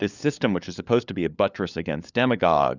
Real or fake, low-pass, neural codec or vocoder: fake; 7.2 kHz; codec, 16 kHz, 4 kbps, FunCodec, trained on LibriTTS, 50 frames a second